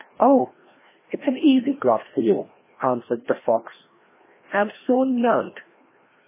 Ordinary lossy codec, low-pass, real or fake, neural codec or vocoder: MP3, 16 kbps; 3.6 kHz; fake; codec, 16 kHz, 1 kbps, FreqCodec, larger model